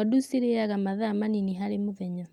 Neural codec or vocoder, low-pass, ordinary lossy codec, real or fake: none; 19.8 kHz; Opus, 32 kbps; real